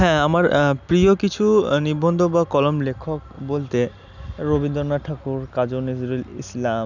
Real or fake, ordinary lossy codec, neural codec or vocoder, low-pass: real; none; none; 7.2 kHz